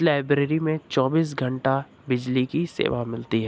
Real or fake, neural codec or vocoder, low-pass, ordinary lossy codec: real; none; none; none